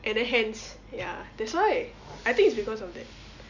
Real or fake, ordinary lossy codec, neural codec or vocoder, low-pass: real; none; none; 7.2 kHz